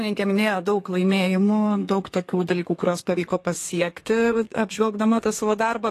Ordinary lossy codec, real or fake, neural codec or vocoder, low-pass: AAC, 48 kbps; fake; codec, 32 kHz, 1.9 kbps, SNAC; 14.4 kHz